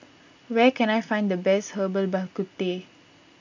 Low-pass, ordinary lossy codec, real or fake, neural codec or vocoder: 7.2 kHz; MP3, 64 kbps; real; none